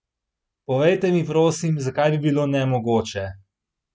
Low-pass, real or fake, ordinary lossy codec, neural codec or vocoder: none; real; none; none